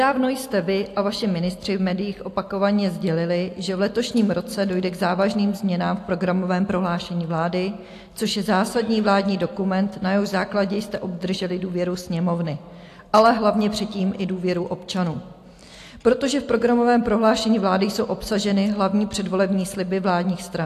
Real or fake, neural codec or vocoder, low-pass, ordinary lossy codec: real; none; 14.4 kHz; AAC, 64 kbps